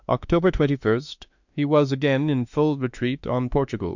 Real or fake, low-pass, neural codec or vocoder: fake; 7.2 kHz; autoencoder, 48 kHz, 32 numbers a frame, DAC-VAE, trained on Japanese speech